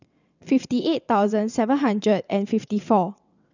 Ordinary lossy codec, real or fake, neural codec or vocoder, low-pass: none; fake; vocoder, 22.05 kHz, 80 mel bands, WaveNeXt; 7.2 kHz